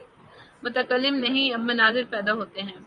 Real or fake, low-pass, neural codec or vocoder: fake; 10.8 kHz; vocoder, 44.1 kHz, 128 mel bands, Pupu-Vocoder